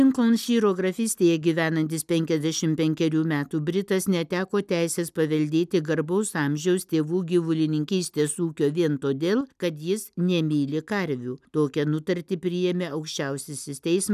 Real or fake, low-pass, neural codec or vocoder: real; 14.4 kHz; none